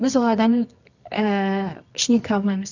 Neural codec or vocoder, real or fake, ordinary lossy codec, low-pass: codec, 24 kHz, 0.9 kbps, WavTokenizer, medium music audio release; fake; none; 7.2 kHz